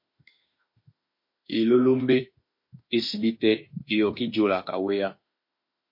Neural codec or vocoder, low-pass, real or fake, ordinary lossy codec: autoencoder, 48 kHz, 32 numbers a frame, DAC-VAE, trained on Japanese speech; 5.4 kHz; fake; MP3, 32 kbps